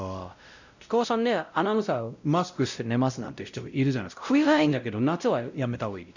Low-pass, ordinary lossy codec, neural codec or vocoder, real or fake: 7.2 kHz; none; codec, 16 kHz, 0.5 kbps, X-Codec, WavLM features, trained on Multilingual LibriSpeech; fake